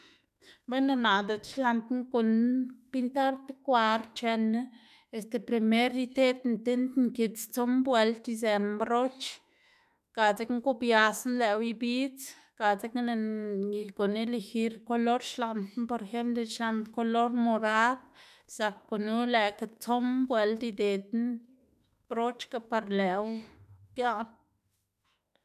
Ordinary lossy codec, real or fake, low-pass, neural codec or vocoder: none; fake; 14.4 kHz; autoencoder, 48 kHz, 32 numbers a frame, DAC-VAE, trained on Japanese speech